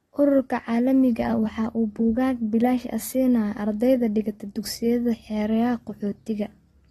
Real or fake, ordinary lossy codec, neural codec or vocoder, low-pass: real; AAC, 32 kbps; none; 19.8 kHz